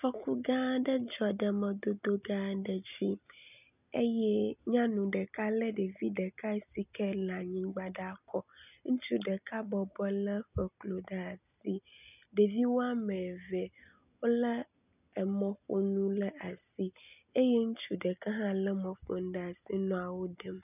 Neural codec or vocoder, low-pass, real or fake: none; 3.6 kHz; real